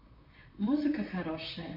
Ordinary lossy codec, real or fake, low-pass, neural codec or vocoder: MP3, 32 kbps; fake; 5.4 kHz; vocoder, 44.1 kHz, 128 mel bands, Pupu-Vocoder